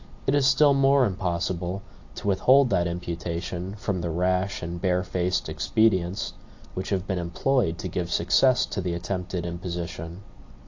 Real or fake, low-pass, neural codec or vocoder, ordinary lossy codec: real; 7.2 kHz; none; AAC, 48 kbps